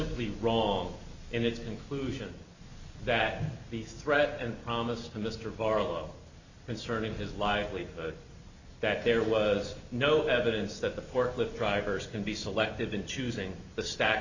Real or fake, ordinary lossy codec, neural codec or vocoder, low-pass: real; Opus, 64 kbps; none; 7.2 kHz